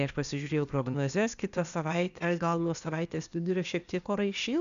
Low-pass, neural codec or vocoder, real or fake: 7.2 kHz; codec, 16 kHz, 0.8 kbps, ZipCodec; fake